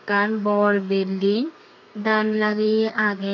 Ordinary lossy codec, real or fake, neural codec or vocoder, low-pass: none; fake; codec, 32 kHz, 1.9 kbps, SNAC; 7.2 kHz